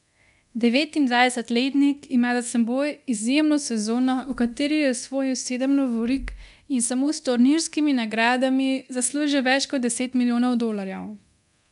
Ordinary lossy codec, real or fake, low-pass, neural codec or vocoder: none; fake; 10.8 kHz; codec, 24 kHz, 0.9 kbps, DualCodec